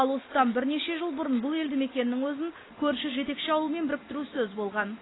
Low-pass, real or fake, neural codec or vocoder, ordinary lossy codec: 7.2 kHz; real; none; AAC, 16 kbps